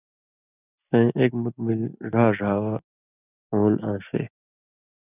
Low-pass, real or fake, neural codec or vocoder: 3.6 kHz; real; none